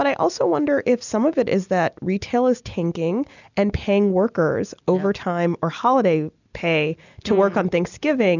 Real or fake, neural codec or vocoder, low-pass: real; none; 7.2 kHz